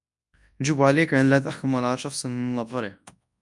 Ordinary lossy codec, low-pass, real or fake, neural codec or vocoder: MP3, 96 kbps; 10.8 kHz; fake; codec, 24 kHz, 0.9 kbps, WavTokenizer, large speech release